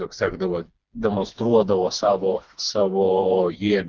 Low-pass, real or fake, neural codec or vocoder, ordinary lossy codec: 7.2 kHz; fake; codec, 16 kHz, 2 kbps, FreqCodec, smaller model; Opus, 24 kbps